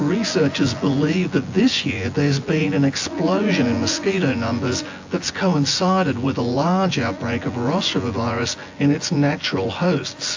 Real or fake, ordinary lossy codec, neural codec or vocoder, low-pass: fake; AAC, 48 kbps; vocoder, 24 kHz, 100 mel bands, Vocos; 7.2 kHz